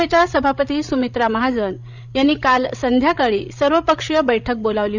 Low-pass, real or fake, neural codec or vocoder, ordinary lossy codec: 7.2 kHz; fake; codec, 16 kHz, 16 kbps, FreqCodec, larger model; none